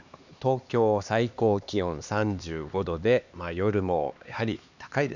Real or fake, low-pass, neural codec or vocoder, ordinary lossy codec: fake; 7.2 kHz; codec, 16 kHz, 2 kbps, X-Codec, HuBERT features, trained on LibriSpeech; none